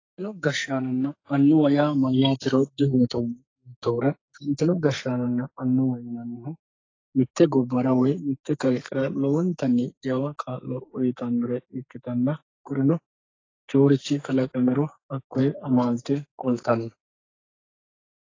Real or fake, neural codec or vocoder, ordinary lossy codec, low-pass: fake; codec, 44.1 kHz, 2.6 kbps, SNAC; AAC, 32 kbps; 7.2 kHz